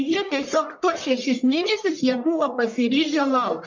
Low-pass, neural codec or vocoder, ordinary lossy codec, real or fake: 7.2 kHz; codec, 44.1 kHz, 1.7 kbps, Pupu-Codec; MP3, 64 kbps; fake